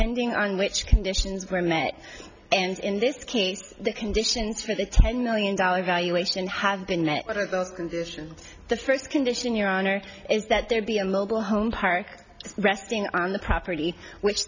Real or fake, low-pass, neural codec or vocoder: real; 7.2 kHz; none